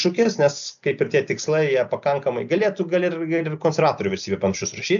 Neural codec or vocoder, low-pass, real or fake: none; 7.2 kHz; real